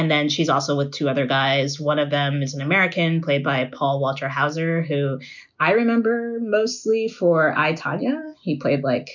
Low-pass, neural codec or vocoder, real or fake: 7.2 kHz; none; real